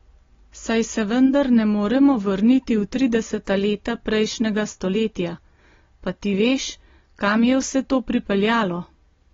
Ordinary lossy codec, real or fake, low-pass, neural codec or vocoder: AAC, 24 kbps; real; 7.2 kHz; none